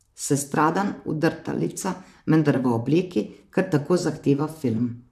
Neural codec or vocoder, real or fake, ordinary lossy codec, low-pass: vocoder, 44.1 kHz, 128 mel bands, Pupu-Vocoder; fake; none; 14.4 kHz